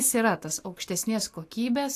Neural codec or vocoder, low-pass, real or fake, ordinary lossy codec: none; 14.4 kHz; real; AAC, 64 kbps